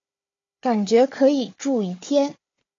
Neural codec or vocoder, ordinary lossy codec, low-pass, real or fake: codec, 16 kHz, 4 kbps, FunCodec, trained on Chinese and English, 50 frames a second; AAC, 32 kbps; 7.2 kHz; fake